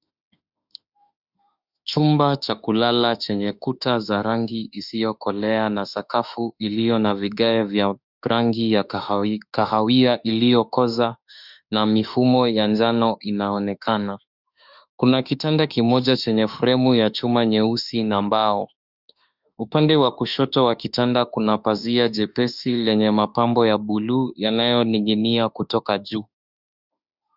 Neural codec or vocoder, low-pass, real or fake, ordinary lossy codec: autoencoder, 48 kHz, 32 numbers a frame, DAC-VAE, trained on Japanese speech; 5.4 kHz; fake; Opus, 64 kbps